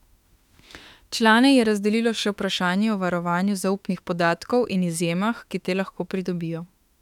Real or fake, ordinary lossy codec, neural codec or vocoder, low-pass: fake; none; autoencoder, 48 kHz, 32 numbers a frame, DAC-VAE, trained on Japanese speech; 19.8 kHz